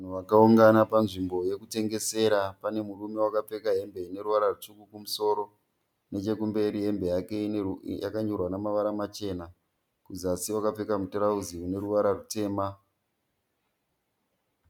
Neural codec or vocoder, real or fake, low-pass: none; real; 19.8 kHz